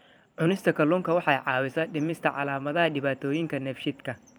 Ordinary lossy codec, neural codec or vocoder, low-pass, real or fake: none; vocoder, 44.1 kHz, 128 mel bands every 256 samples, BigVGAN v2; none; fake